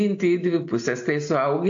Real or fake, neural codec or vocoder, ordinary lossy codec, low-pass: real; none; AAC, 48 kbps; 7.2 kHz